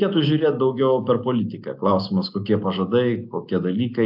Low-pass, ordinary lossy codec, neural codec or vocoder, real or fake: 5.4 kHz; AAC, 48 kbps; none; real